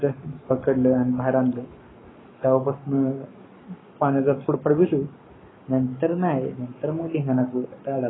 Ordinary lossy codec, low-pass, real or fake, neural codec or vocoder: AAC, 16 kbps; 7.2 kHz; fake; codec, 44.1 kHz, 7.8 kbps, Pupu-Codec